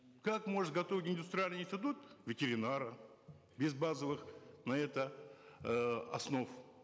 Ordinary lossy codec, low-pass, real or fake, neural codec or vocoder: none; none; real; none